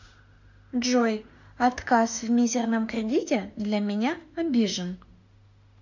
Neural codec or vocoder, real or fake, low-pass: autoencoder, 48 kHz, 32 numbers a frame, DAC-VAE, trained on Japanese speech; fake; 7.2 kHz